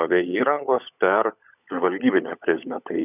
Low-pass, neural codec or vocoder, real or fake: 3.6 kHz; codec, 16 kHz, 16 kbps, FunCodec, trained on LibriTTS, 50 frames a second; fake